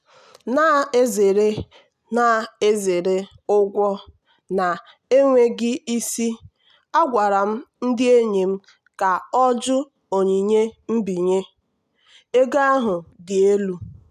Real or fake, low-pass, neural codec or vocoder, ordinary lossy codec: real; 14.4 kHz; none; none